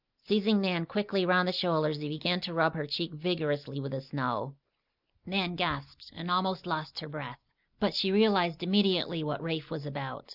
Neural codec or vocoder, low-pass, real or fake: none; 5.4 kHz; real